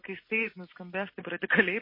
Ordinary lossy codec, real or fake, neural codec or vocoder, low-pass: MP3, 24 kbps; real; none; 5.4 kHz